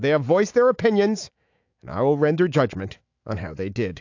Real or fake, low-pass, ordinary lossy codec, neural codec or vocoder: fake; 7.2 kHz; AAC, 48 kbps; autoencoder, 48 kHz, 128 numbers a frame, DAC-VAE, trained on Japanese speech